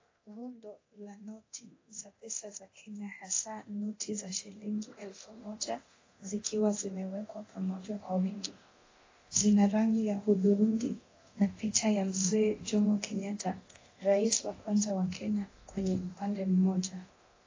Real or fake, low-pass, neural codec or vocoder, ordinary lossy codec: fake; 7.2 kHz; codec, 24 kHz, 0.9 kbps, DualCodec; AAC, 32 kbps